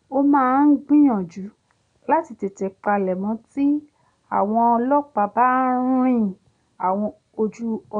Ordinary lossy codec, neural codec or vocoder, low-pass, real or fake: none; none; 9.9 kHz; real